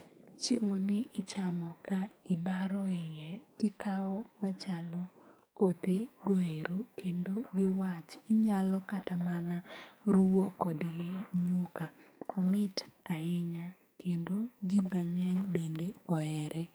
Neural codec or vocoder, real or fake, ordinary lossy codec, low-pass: codec, 44.1 kHz, 2.6 kbps, SNAC; fake; none; none